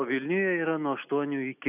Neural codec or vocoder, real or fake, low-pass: none; real; 3.6 kHz